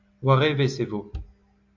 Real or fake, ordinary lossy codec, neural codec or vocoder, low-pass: real; AAC, 48 kbps; none; 7.2 kHz